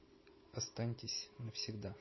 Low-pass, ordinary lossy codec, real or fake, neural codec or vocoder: 7.2 kHz; MP3, 24 kbps; real; none